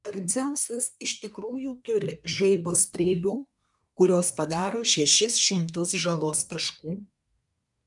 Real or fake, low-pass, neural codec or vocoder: fake; 10.8 kHz; codec, 24 kHz, 1 kbps, SNAC